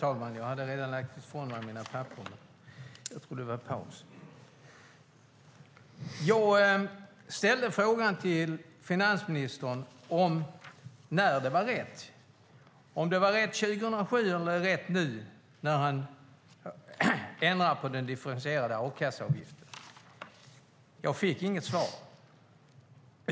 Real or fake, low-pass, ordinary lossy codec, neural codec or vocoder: real; none; none; none